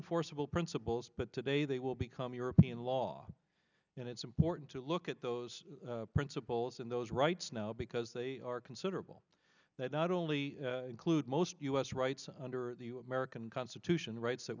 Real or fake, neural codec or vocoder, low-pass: real; none; 7.2 kHz